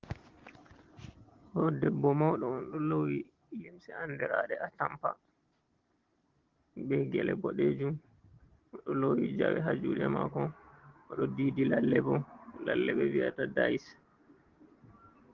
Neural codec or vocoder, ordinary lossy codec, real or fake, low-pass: none; Opus, 16 kbps; real; 7.2 kHz